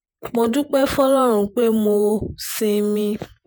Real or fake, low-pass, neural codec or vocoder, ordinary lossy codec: fake; none; vocoder, 48 kHz, 128 mel bands, Vocos; none